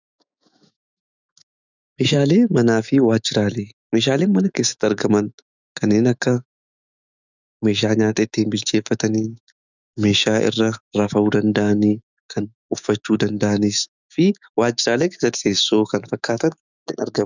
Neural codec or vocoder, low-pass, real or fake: autoencoder, 48 kHz, 128 numbers a frame, DAC-VAE, trained on Japanese speech; 7.2 kHz; fake